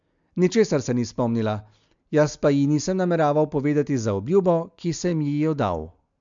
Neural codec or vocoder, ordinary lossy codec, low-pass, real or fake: none; MP3, 64 kbps; 7.2 kHz; real